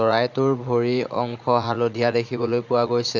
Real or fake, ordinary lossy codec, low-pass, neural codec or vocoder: fake; none; 7.2 kHz; vocoder, 44.1 kHz, 80 mel bands, Vocos